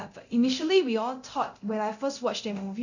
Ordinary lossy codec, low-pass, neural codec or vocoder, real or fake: none; 7.2 kHz; codec, 24 kHz, 0.9 kbps, DualCodec; fake